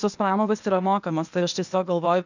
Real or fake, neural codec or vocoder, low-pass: fake; codec, 16 kHz, 0.8 kbps, ZipCodec; 7.2 kHz